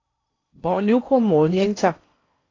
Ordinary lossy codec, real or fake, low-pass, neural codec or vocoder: AAC, 32 kbps; fake; 7.2 kHz; codec, 16 kHz in and 24 kHz out, 0.6 kbps, FocalCodec, streaming, 4096 codes